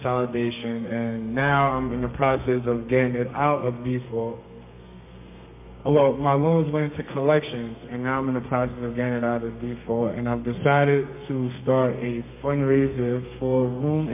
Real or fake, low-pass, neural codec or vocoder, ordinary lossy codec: fake; 3.6 kHz; codec, 32 kHz, 1.9 kbps, SNAC; MP3, 32 kbps